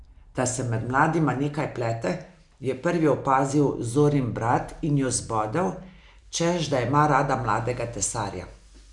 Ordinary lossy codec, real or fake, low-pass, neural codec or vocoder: none; real; 10.8 kHz; none